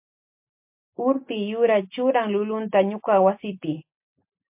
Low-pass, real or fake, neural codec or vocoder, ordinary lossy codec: 3.6 kHz; real; none; MP3, 32 kbps